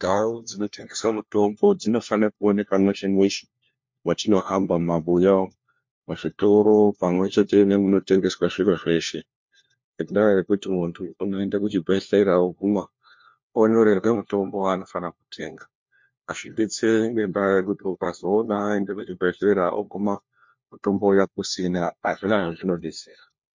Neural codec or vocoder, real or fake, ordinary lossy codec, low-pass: codec, 16 kHz, 1 kbps, FunCodec, trained on LibriTTS, 50 frames a second; fake; MP3, 48 kbps; 7.2 kHz